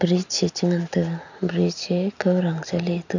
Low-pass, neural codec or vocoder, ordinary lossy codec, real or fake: 7.2 kHz; none; none; real